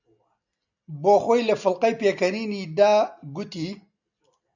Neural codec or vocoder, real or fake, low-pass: none; real; 7.2 kHz